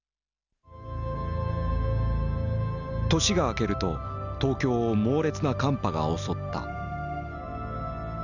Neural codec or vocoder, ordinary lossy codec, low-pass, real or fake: none; none; 7.2 kHz; real